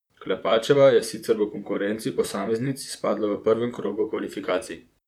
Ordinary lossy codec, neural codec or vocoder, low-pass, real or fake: none; vocoder, 44.1 kHz, 128 mel bands, Pupu-Vocoder; 19.8 kHz; fake